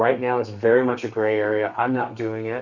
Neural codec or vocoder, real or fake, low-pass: codec, 32 kHz, 1.9 kbps, SNAC; fake; 7.2 kHz